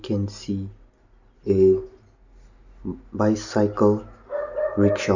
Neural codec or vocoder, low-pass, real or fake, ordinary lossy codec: none; 7.2 kHz; real; none